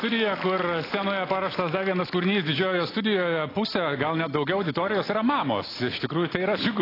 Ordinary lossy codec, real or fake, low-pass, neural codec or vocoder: AAC, 24 kbps; real; 5.4 kHz; none